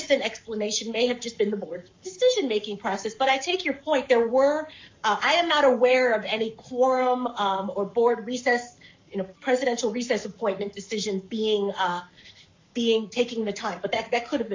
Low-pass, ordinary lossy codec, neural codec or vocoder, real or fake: 7.2 kHz; MP3, 48 kbps; codec, 44.1 kHz, 7.8 kbps, Pupu-Codec; fake